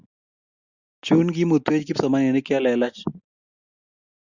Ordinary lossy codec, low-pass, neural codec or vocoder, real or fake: Opus, 64 kbps; 7.2 kHz; none; real